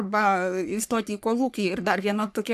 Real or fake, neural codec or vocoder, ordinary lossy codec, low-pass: fake; codec, 44.1 kHz, 3.4 kbps, Pupu-Codec; AAC, 96 kbps; 14.4 kHz